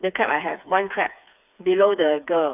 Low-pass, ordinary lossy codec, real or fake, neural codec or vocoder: 3.6 kHz; none; fake; codec, 16 kHz, 4 kbps, FreqCodec, smaller model